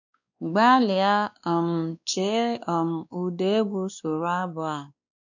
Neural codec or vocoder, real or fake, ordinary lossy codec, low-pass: codec, 16 kHz, 2 kbps, X-Codec, WavLM features, trained on Multilingual LibriSpeech; fake; none; 7.2 kHz